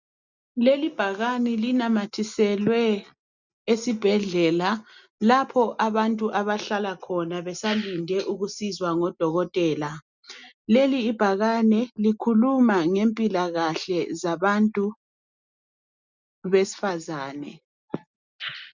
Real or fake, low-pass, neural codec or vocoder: real; 7.2 kHz; none